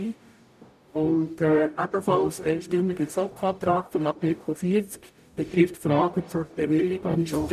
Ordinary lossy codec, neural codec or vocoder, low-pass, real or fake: none; codec, 44.1 kHz, 0.9 kbps, DAC; 14.4 kHz; fake